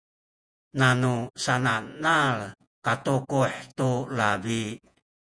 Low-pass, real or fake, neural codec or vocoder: 9.9 kHz; fake; vocoder, 48 kHz, 128 mel bands, Vocos